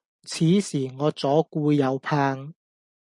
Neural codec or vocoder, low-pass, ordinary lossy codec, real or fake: none; 10.8 kHz; MP3, 96 kbps; real